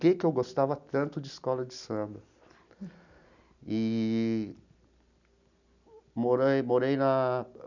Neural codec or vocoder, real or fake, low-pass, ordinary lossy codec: none; real; 7.2 kHz; none